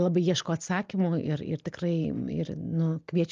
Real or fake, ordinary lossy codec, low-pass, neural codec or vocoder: real; Opus, 32 kbps; 7.2 kHz; none